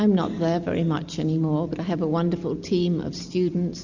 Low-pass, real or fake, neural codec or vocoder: 7.2 kHz; real; none